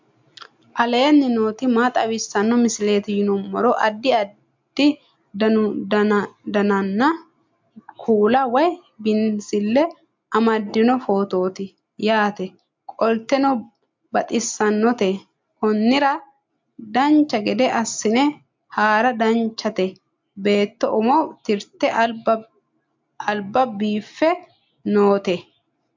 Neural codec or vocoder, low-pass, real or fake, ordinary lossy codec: none; 7.2 kHz; real; MP3, 64 kbps